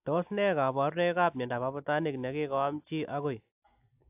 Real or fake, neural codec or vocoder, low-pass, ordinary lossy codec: real; none; 3.6 kHz; AAC, 32 kbps